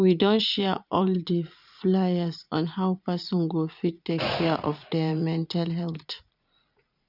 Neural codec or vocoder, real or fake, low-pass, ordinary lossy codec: vocoder, 44.1 kHz, 80 mel bands, Vocos; fake; 5.4 kHz; none